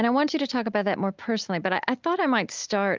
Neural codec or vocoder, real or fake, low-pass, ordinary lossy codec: none; real; 7.2 kHz; Opus, 32 kbps